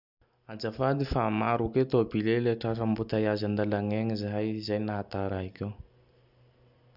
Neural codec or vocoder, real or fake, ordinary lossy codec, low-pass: none; real; none; 5.4 kHz